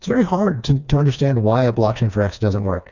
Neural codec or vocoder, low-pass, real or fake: codec, 16 kHz, 2 kbps, FreqCodec, smaller model; 7.2 kHz; fake